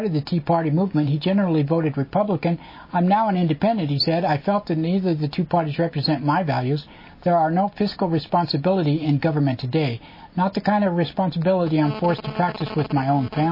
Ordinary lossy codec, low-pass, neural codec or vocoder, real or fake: MP3, 24 kbps; 5.4 kHz; none; real